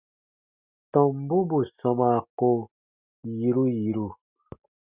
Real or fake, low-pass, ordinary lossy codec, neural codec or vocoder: real; 3.6 kHz; Opus, 64 kbps; none